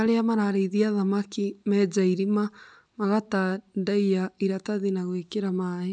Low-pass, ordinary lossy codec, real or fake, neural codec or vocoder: 9.9 kHz; none; real; none